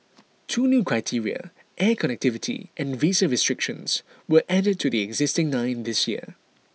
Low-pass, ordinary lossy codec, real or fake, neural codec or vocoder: none; none; real; none